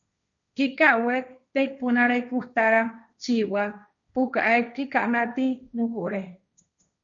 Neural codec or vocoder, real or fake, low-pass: codec, 16 kHz, 1.1 kbps, Voila-Tokenizer; fake; 7.2 kHz